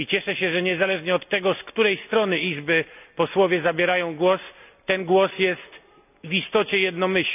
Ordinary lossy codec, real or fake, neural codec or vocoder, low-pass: none; real; none; 3.6 kHz